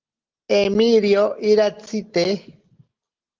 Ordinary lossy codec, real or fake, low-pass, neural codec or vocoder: Opus, 16 kbps; real; 7.2 kHz; none